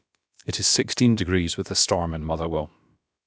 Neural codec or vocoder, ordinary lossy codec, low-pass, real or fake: codec, 16 kHz, about 1 kbps, DyCAST, with the encoder's durations; none; none; fake